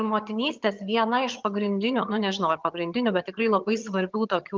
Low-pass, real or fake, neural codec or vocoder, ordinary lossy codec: 7.2 kHz; fake; vocoder, 22.05 kHz, 80 mel bands, HiFi-GAN; Opus, 24 kbps